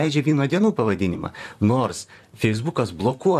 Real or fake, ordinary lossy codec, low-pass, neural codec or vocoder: fake; AAC, 96 kbps; 14.4 kHz; vocoder, 44.1 kHz, 128 mel bands, Pupu-Vocoder